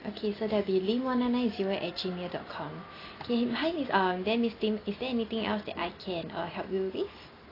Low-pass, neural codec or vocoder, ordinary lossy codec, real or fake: 5.4 kHz; none; AAC, 24 kbps; real